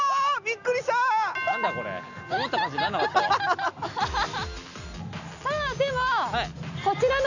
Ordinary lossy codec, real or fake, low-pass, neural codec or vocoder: none; real; 7.2 kHz; none